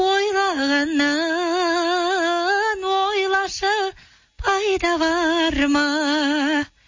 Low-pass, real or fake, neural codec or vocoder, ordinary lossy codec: 7.2 kHz; real; none; MP3, 32 kbps